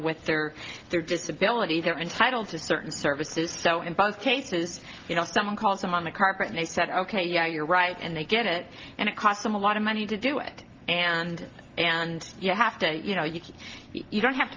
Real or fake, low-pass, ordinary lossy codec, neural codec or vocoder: real; 7.2 kHz; Opus, 32 kbps; none